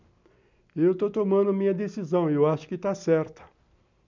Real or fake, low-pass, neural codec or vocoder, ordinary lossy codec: real; 7.2 kHz; none; none